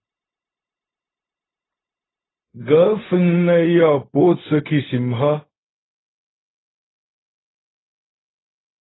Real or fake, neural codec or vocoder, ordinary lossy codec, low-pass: fake; codec, 16 kHz, 0.4 kbps, LongCat-Audio-Codec; AAC, 16 kbps; 7.2 kHz